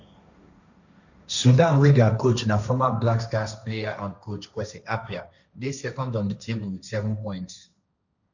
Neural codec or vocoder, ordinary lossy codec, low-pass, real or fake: codec, 16 kHz, 1.1 kbps, Voila-Tokenizer; none; 7.2 kHz; fake